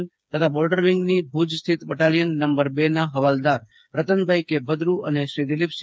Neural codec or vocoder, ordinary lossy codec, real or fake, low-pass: codec, 16 kHz, 4 kbps, FreqCodec, smaller model; none; fake; none